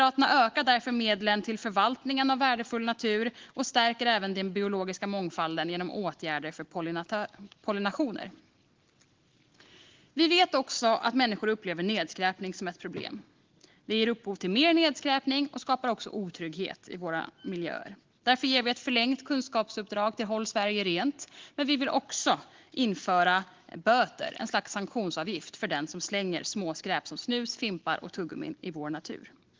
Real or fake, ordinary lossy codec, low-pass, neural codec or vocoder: real; Opus, 16 kbps; 7.2 kHz; none